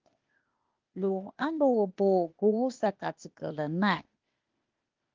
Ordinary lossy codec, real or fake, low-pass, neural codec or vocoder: Opus, 24 kbps; fake; 7.2 kHz; codec, 16 kHz, 0.8 kbps, ZipCodec